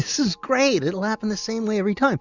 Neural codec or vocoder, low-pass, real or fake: none; 7.2 kHz; real